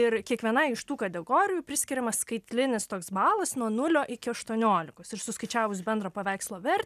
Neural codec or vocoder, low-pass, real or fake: none; 14.4 kHz; real